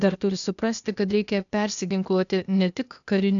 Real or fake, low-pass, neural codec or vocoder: fake; 7.2 kHz; codec, 16 kHz, 0.8 kbps, ZipCodec